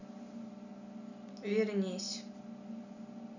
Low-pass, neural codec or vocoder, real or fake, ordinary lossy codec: 7.2 kHz; none; real; none